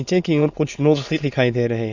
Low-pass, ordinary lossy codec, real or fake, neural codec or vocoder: 7.2 kHz; Opus, 64 kbps; fake; autoencoder, 22.05 kHz, a latent of 192 numbers a frame, VITS, trained on many speakers